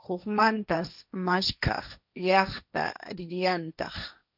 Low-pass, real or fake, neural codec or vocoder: 5.4 kHz; fake; codec, 16 kHz, 1.1 kbps, Voila-Tokenizer